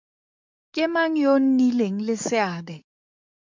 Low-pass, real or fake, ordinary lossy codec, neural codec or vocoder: 7.2 kHz; fake; MP3, 64 kbps; codec, 44.1 kHz, 7.8 kbps, DAC